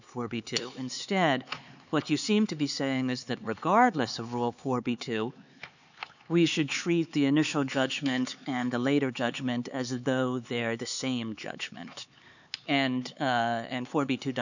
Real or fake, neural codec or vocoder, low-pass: fake; codec, 16 kHz, 4 kbps, X-Codec, HuBERT features, trained on LibriSpeech; 7.2 kHz